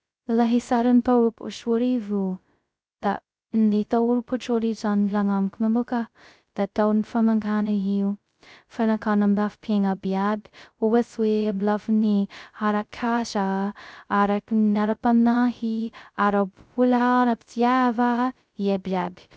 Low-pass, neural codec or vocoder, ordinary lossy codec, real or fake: none; codec, 16 kHz, 0.2 kbps, FocalCodec; none; fake